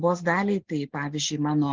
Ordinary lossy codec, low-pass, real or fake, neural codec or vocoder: Opus, 16 kbps; 7.2 kHz; real; none